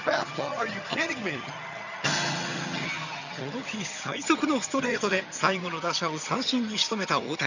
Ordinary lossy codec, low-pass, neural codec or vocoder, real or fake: none; 7.2 kHz; vocoder, 22.05 kHz, 80 mel bands, HiFi-GAN; fake